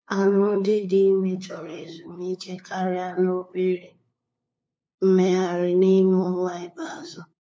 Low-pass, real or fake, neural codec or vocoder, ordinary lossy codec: none; fake; codec, 16 kHz, 2 kbps, FunCodec, trained on LibriTTS, 25 frames a second; none